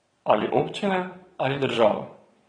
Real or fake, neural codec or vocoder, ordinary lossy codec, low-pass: fake; vocoder, 22.05 kHz, 80 mel bands, WaveNeXt; AAC, 32 kbps; 9.9 kHz